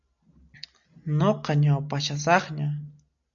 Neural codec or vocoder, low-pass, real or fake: none; 7.2 kHz; real